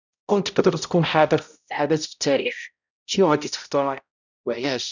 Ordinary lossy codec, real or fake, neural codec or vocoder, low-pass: none; fake; codec, 16 kHz, 0.5 kbps, X-Codec, HuBERT features, trained on balanced general audio; 7.2 kHz